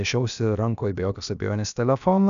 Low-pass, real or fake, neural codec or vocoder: 7.2 kHz; fake; codec, 16 kHz, about 1 kbps, DyCAST, with the encoder's durations